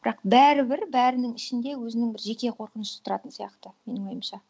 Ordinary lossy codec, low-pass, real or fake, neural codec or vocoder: none; none; real; none